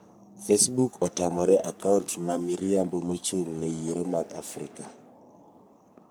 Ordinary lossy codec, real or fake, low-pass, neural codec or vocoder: none; fake; none; codec, 44.1 kHz, 3.4 kbps, Pupu-Codec